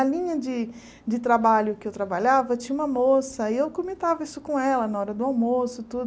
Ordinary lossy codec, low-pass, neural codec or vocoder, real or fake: none; none; none; real